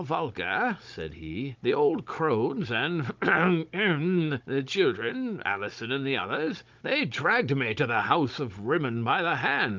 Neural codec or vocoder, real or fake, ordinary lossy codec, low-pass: codec, 16 kHz, 16 kbps, FunCodec, trained on Chinese and English, 50 frames a second; fake; Opus, 24 kbps; 7.2 kHz